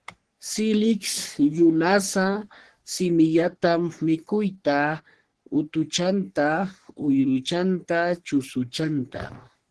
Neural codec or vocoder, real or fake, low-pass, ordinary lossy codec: codec, 44.1 kHz, 3.4 kbps, Pupu-Codec; fake; 10.8 kHz; Opus, 16 kbps